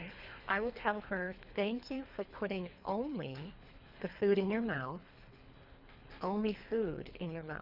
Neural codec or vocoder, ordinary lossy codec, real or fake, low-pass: codec, 24 kHz, 3 kbps, HILCodec; Opus, 64 kbps; fake; 5.4 kHz